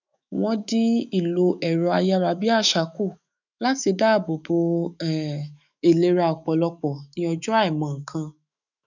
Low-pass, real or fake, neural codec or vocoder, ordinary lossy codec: 7.2 kHz; fake; autoencoder, 48 kHz, 128 numbers a frame, DAC-VAE, trained on Japanese speech; none